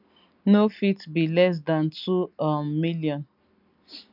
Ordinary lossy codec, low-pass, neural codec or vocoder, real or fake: none; 5.4 kHz; none; real